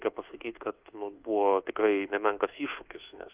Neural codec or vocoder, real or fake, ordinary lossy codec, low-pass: codec, 24 kHz, 1.2 kbps, DualCodec; fake; Opus, 32 kbps; 3.6 kHz